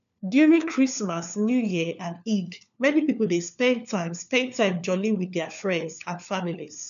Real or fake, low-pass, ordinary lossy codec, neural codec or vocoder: fake; 7.2 kHz; none; codec, 16 kHz, 4 kbps, FunCodec, trained on LibriTTS, 50 frames a second